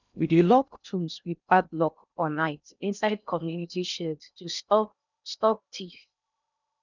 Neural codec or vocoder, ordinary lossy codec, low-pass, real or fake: codec, 16 kHz in and 24 kHz out, 0.6 kbps, FocalCodec, streaming, 2048 codes; none; 7.2 kHz; fake